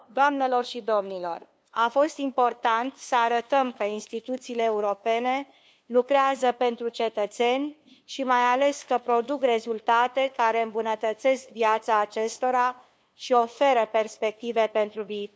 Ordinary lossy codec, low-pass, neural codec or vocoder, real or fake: none; none; codec, 16 kHz, 2 kbps, FunCodec, trained on LibriTTS, 25 frames a second; fake